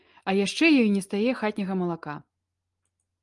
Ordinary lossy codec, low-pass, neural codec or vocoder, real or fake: Opus, 32 kbps; 10.8 kHz; none; real